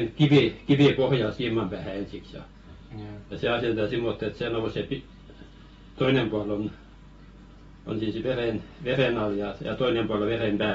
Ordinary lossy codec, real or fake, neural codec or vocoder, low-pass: AAC, 24 kbps; real; none; 19.8 kHz